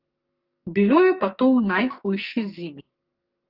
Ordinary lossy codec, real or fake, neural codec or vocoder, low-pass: Opus, 32 kbps; fake; codec, 44.1 kHz, 2.6 kbps, SNAC; 5.4 kHz